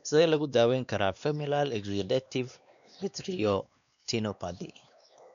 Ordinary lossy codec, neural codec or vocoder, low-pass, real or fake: none; codec, 16 kHz, 2 kbps, X-Codec, WavLM features, trained on Multilingual LibriSpeech; 7.2 kHz; fake